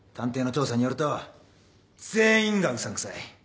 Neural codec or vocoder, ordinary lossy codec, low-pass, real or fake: none; none; none; real